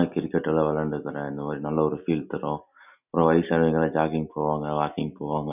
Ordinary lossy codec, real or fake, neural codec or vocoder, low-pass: none; real; none; 3.6 kHz